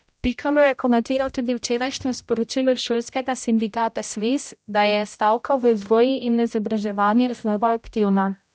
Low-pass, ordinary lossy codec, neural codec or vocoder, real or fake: none; none; codec, 16 kHz, 0.5 kbps, X-Codec, HuBERT features, trained on general audio; fake